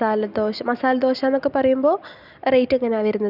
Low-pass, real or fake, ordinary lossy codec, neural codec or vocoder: 5.4 kHz; real; none; none